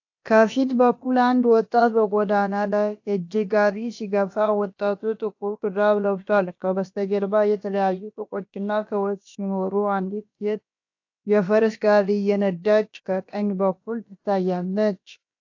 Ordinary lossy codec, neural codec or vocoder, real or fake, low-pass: AAC, 48 kbps; codec, 16 kHz, about 1 kbps, DyCAST, with the encoder's durations; fake; 7.2 kHz